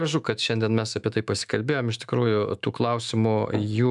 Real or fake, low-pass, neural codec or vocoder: fake; 10.8 kHz; autoencoder, 48 kHz, 128 numbers a frame, DAC-VAE, trained on Japanese speech